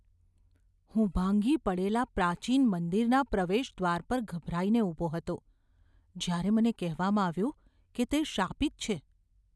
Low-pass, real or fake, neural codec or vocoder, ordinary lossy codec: none; real; none; none